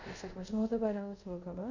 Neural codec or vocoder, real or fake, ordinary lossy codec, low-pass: codec, 24 kHz, 0.5 kbps, DualCodec; fake; none; 7.2 kHz